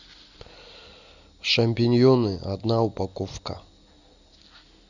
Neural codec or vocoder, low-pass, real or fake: none; 7.2 kHz; real